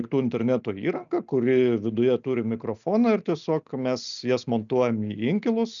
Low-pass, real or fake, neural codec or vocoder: 7.2 kHz; real; none